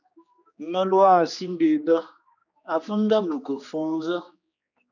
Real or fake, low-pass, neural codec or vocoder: fake; 7.2 kHz; codec, 16 kHz, 2 kbps, X-Codec, HuBERT features, trained on general audio